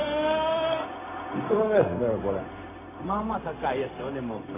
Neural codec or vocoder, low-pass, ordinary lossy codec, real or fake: codec, 16 kHz, 0.4 kbps, LongCat-Audio-Codec; 3.6 kHz; MP3, 16 kbps; fake